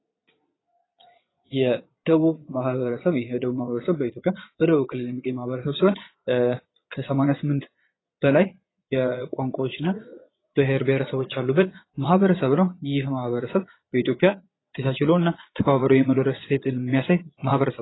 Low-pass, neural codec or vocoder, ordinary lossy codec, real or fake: 7.2 kHz; vocoder, 22.05 kHz, 80 mel bands, Vocos; AAC, 16 kbps; fake